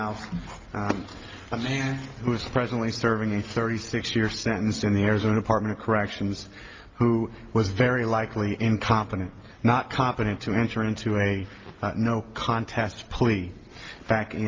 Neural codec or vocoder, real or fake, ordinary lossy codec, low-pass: none; real; Opus, 24 kbps; 7.2 kHz